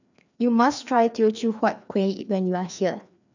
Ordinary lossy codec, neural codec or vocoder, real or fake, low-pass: none; codec, 16 kHz, 2 kbps, FreqCodec, larger model; fake; 7.2 kHz